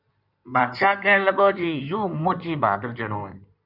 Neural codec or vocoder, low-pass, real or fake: codec, 16 kHz in and 24 kHz out, 2.2 kbps, FireRedTTS-2 codec; 5.4 kHz; fake